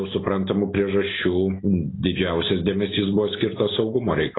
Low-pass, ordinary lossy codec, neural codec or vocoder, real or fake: 7.2 kHz; AAC, 16 kbps; none; real